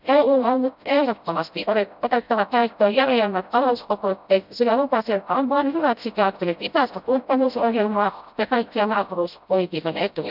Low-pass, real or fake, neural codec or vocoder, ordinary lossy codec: 5.4 kHz; fake; codec, 16 kHz, 0.5 kbps, FreqCodec, smaller model; none